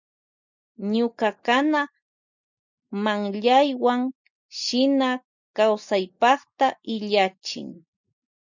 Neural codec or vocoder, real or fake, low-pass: none; real; 7.2 kHz